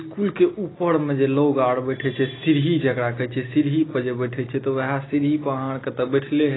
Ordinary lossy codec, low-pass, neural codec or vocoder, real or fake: AAC, 16 kbps; 7.2 kHz; none; real